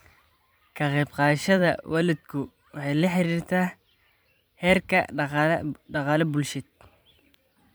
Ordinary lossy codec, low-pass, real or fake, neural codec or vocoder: none; none; fake; vocoder, 44.1 kHz, 128 mel bands every 512 samples, BigVGAN v2